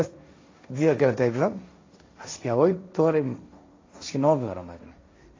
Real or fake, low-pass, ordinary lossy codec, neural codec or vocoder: fake; 7.2 kHz; AAC, 32 kbps; codec, 16 kHz, 1.1 kbps, Voila-Tokenizer